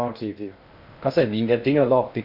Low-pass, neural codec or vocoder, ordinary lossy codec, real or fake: 5.4 kHz; codec, 16 kHz in and 24 kHz out, 0.6 kbps, FocalCodec, streaming, 2048 codes; none; fake